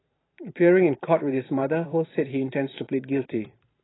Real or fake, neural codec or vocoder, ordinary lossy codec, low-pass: fake; vocoder, 44.1 kHz, 128 mel bands every 512 samples, BigVGAN v2; AAC, 16 kbps; 7.2 kHz